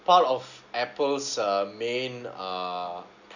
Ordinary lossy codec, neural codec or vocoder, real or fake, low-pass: none; none; real; 7.2 kHz